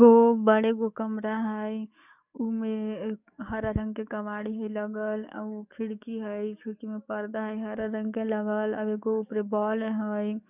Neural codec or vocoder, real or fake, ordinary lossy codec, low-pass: codec, 44.1 kHz, 7.8 kbps, DAC; fake; none; 3.6 kHz